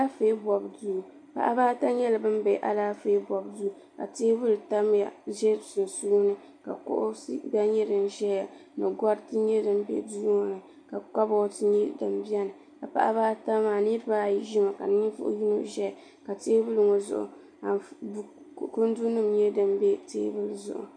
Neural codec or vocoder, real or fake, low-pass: none; real; 9.9 kHz